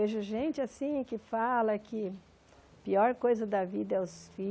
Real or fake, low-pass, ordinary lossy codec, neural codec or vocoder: real; none; none; none